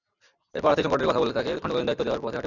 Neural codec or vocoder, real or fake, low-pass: none; real; 7.2 kHz